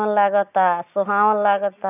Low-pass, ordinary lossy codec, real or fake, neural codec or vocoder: 3.6 kHz; none; real; none